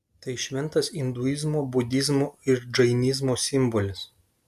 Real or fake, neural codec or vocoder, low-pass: real; none; 14.4 kHz